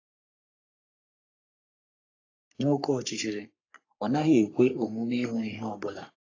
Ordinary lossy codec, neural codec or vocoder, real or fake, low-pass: AAC, 32 kbps; codec, 44.1 kHz, 3.4 kbps, Pupu-Codec; fake; 7.2 kHz